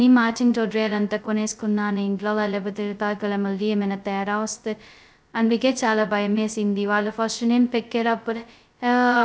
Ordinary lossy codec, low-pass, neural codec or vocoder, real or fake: none; none; codec, 16 kHz, 0.2 kbps, FocalCodec; fake